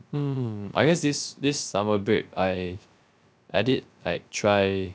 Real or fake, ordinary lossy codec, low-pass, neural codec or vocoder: fake; none; none; codec, 16 kHz, 0.3 kbps, FocalCodec